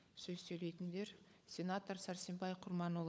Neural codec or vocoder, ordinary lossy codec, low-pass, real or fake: codec, 16 kHz, 16 kbps, FunCodec, trained on LibriTTS, 50 frames a second; none; none; fake